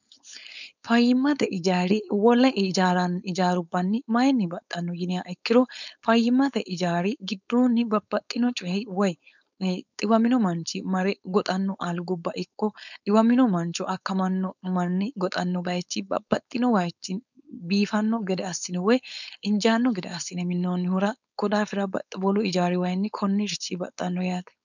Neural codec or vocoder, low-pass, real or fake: codec, 16 kHz, 4.8 kbps, FACodec; 7.2 kHz; fake